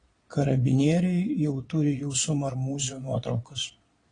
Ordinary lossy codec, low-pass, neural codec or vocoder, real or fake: AAC, 32 kbps; 9.9 kHz; vocoder, 22.05 kHz, 80 mel bands, Vocos; fake